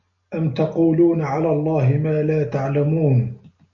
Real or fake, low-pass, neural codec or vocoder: real; 7.2 kHz; none